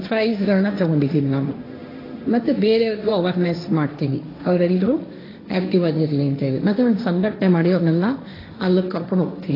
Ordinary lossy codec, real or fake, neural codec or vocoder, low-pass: AAC, 24 kbps; fake; codec, 16 kHz, 1.1 kbps, Voila-Tokenizer; 5.4 kHz